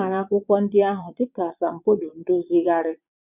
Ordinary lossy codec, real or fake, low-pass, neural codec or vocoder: none; fake; 3.6 kHz; vocoder, 22.05 kHz, 80 mel bands, Vocos